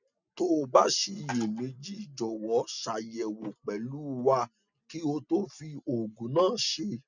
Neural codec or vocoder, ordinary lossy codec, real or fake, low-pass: none; none; real; 7.2 kHz